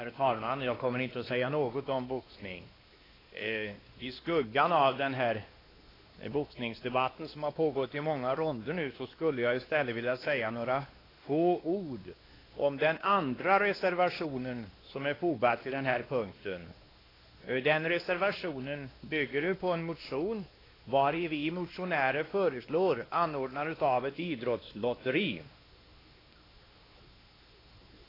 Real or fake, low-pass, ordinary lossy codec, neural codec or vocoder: fake; 5.4 kHz; AAC, 24 kbps; codec, 16 kHz, 2 kbps, X-Codec, WavLM features, trained on Multilingual LibriSpeech